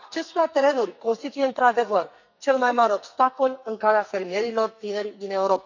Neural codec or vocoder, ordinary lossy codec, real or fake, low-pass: codec, 44.1 kHz, 2.6 kbps, SNAC; none; fake; 7.2 kHz